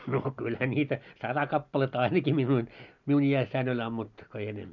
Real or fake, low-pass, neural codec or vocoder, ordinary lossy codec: real; 7.2 kHz; none; none